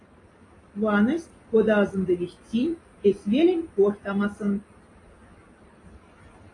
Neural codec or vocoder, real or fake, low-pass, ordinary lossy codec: none; real; 10.8 kHz; AAC, 32 kbps